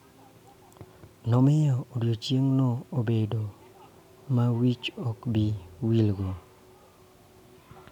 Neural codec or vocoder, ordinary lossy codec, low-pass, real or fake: none; none; 19.8 kHz; real